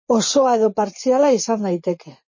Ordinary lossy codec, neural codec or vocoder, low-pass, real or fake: MP3, 32 kbps; vocoder, 24 kHz, 100 mel bands, Vocos; 7.2 kHz; fake